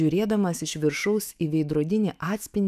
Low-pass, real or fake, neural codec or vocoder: 14.4 kHz; fake; autoencoder, 48 kHz, 128 numbers a frame, DAC-VAE, trained on Japanese speech